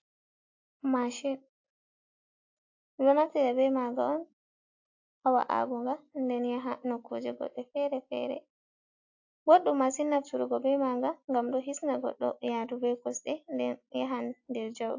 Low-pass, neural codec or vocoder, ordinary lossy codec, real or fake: 7.2 kHz; none; AAC, 48 kbps; real